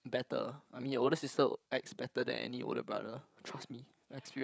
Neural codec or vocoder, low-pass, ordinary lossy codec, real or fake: codec, 16 kHz, 8 kbps, FreqCodec, larger model; none; none; fake